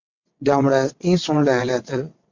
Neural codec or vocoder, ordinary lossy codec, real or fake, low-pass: vocoder, 22.05 kHz, 80 mel bands, WaveNeXt; MP3, 48 kbps; fake; 7.2 kHz